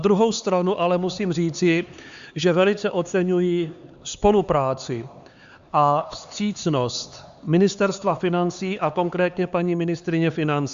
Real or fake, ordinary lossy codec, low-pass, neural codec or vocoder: fake; Opus, 64 kbps; 7.2 kHz; codec, 16 kHz, 4 kbps, X-Codec, HuBERT features, trained on LibriSpeech